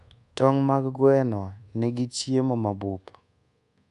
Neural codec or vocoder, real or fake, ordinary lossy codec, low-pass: codec, 24 kHz, 1.2 kbps, DualCodec; fake; none; 10.8 kHz